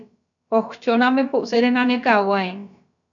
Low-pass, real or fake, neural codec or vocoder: 7.2 kHz; fake; codec, 16 kHz, about 1 kbps, DyCAST, with the encoder's durations